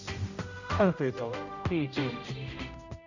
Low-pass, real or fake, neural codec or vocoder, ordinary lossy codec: 7.2 kHz; fake; codec, 16 kHz, 0.5 kbps, X-Codec, HuBERT features, trained on general audio; none